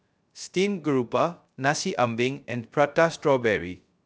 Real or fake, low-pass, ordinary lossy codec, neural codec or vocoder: fake; none; none; codec, 16 kHz, 0.3 kbps, FocalCodec